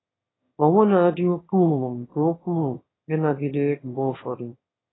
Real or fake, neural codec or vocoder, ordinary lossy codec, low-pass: fake; autoencoder, 22.05 kHz, a latent of 192 numbers a frame, VITS, trained on one speaker; AAC, 16 kbps; 7.2 kHz